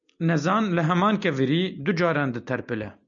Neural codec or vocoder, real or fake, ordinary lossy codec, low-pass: none; real; MP3, 96 kbps; 7.2 kHz